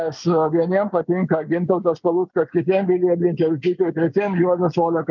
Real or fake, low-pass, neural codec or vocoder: real; 7.2 kHz; none